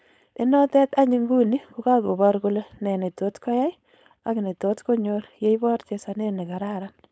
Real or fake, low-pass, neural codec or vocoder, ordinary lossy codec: fake; none; codec, 16 kHz, 4.8 kbps, FACodec; none